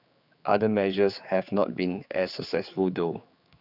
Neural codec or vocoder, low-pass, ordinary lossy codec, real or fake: codec, 16 kHz, 4 kbps, X-Codec, HuBERT features, trained on general audio; 5.4 kHz; none; fake